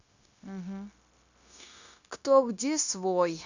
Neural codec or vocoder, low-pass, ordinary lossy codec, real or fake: codec, 16 kHz, 0.9 kbps, LongCat-Audio-Codec; 7.2 kHz; none; fake